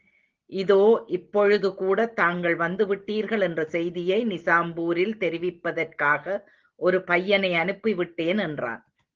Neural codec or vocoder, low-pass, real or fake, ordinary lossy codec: none; 7.2 kHz; real; Opus, 16 kbps